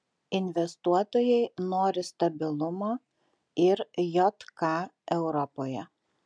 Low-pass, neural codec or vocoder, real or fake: 9.9 kHz; none; real